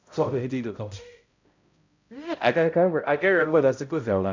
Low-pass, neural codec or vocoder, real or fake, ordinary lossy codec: 7.2 kHz; codec, 16 kHz, 0.5 kbps, X-Codec, HuBERT features, trained on balanced general audio; fake; MP3, 64 kbps